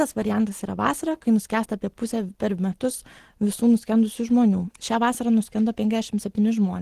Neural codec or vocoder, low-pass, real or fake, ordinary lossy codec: none; 14.4 kHz; real; Opus, 16 kbps